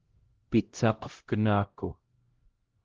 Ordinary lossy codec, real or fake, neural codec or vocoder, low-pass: Opus, 16 kbps; fake; codec, 16 kHz, 0.5 kbps, X-Codec, HuBERT features, trained on LibriSpeech; 7.2 kHz